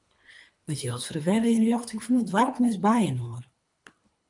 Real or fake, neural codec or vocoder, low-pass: fake; codec, 24 kHz, 3 kbps, HILCodec; 10.8 kHz